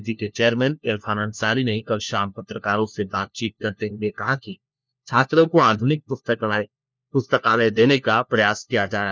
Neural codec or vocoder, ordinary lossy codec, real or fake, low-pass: codec, 16 kHz, 1 kbps, FunCodec, trained on LibriTTS, 50 frames a second; none; fake; none